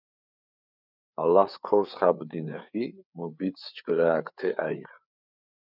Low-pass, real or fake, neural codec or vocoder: 5.4 kHz; fake; codec, 16 kHz, 4 kbps, FreqCodec, larger model